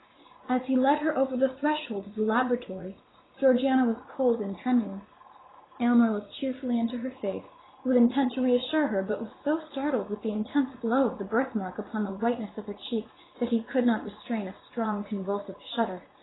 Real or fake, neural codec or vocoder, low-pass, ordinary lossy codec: fake; vocoder, 44.1 kHz, 80 mel bands, Vocos; 7.2 kHz; AAC, 16 kbps